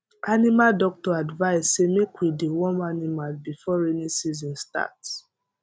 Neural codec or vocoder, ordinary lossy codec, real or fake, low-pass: none; none; real; none